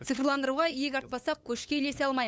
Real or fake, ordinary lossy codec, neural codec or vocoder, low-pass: fake; none; codec, 16 kHz, 8 kbps, FunCodec, trained on LibriTTS, 25 frames a second; none